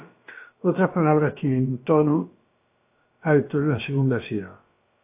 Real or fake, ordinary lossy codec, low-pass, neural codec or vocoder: fake; AAC, 24 kbps; 3.6 kHz; codec, 16 kHz, about 1 kbps, DyCAST, with the encoder's durations